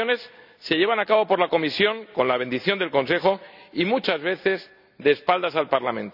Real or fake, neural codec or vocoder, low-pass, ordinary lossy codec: real; none; 5.4 kHz; none